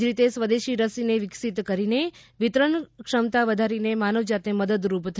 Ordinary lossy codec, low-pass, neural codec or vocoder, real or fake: none; none; none; real